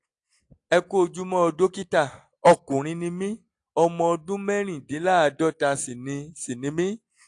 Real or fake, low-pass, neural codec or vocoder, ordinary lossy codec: real; 10.8 kHz; none; AAC, 64 kbps